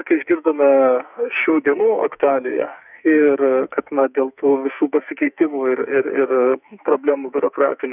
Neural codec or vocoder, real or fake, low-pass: codec, 44.1 kHz, 2.6 kbps, SNAC; fake; 3.6 kHz